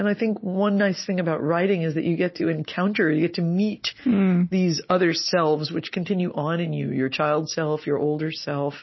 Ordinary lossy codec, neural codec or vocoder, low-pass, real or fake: MP3, 24 kbps; none; 7.2 kHz; real